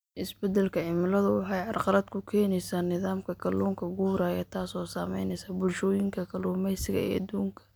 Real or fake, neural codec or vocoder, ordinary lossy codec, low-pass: real; none; none; none